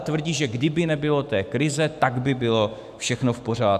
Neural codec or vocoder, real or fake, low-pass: autoencoder, 48 kHz, 128 numbers a frame, DAC-VAE, trained on Japanese speech; fake; 14.4 kHz